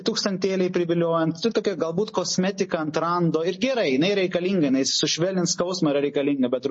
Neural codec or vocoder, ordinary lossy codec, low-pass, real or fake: none; MP3, 32 kbps; 10.8 kHz; real